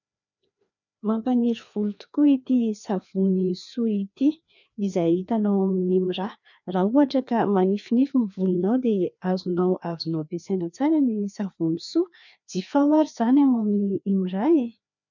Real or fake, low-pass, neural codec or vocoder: fake; 7.2 kHz; codec, 16 kHz, 2 kbps, FreqCodec, larger model